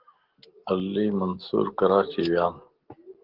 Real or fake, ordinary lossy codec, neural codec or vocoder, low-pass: real; Opus, 16 kbps; none; 5.4 kHz